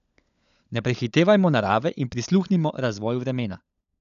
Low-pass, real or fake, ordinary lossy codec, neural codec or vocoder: 7.2 kHz; fake; none; codec, 16 kHz, 16 kbps, FunCodec, trained on LibriTTS, 50 frames a second